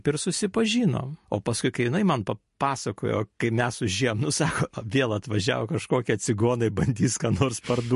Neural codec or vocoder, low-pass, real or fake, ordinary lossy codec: none; 14.4 kHz; real; MP3, 48 kbps